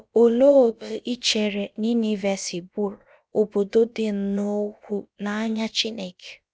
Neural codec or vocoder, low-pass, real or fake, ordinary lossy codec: codec, 16 kHz, about 1 kbps, DyCAST, with the encoder's durations; none; fake; none